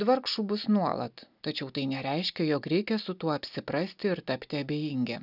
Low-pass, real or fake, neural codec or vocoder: 5.4 kHz; real; none